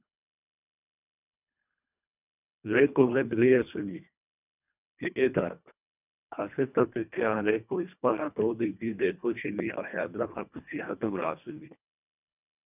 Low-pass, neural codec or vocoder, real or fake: 3.6 kHz; codec, 24 kHz, 1.5 kbps, HILCodec; fake